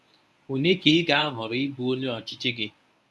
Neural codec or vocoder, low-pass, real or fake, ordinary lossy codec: codec, 24 kHz, 0.9 kbps, WavTokenizer, medium speech release version 1; none; fake; none